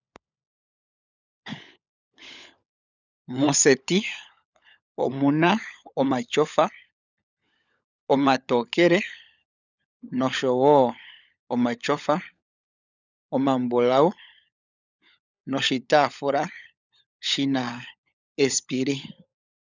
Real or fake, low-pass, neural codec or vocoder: fake; 7.2 kHz; codec, 16 kHz, 16 kbps, FunCodec, trained on LibriTTS, 50 frames a second